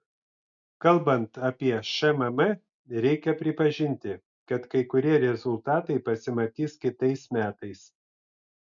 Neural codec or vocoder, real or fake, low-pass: none; real; 7.2 kHz